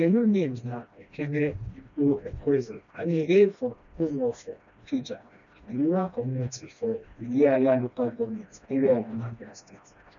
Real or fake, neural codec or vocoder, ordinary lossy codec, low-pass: fake; codec, 16 kHz, 1 kbps, FreqCodec, smaller model; none; 7.2 kHz